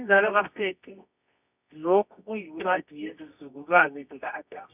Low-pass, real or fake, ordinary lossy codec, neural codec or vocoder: 3.6 kHz; fake; none; codec, 24 kHz, 0.9 kbps, WavTokenizer, medium music audio release